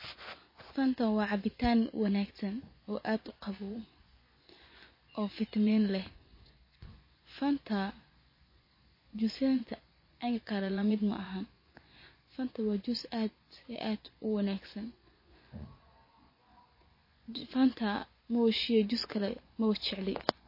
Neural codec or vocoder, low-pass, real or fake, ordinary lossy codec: vocoder, 24 kHz, 100 mel bands, Vocos; 5.4 kHz; fake; MP3, 24 kbps